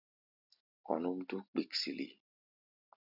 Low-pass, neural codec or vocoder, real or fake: 5.4 kHz; none; real